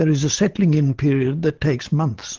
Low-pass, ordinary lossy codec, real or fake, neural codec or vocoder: 7.2 kHz; Opus, 16 kbps; real; none